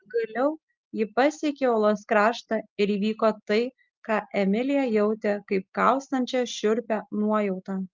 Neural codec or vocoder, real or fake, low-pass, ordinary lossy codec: none; real; 7.2 kHz; Opus, 24 kbps